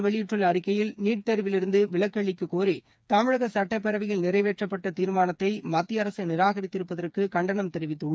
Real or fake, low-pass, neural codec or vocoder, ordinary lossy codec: fake; none; codec, 16 kHz, 4 kbps, FreqCodec, smaller model; none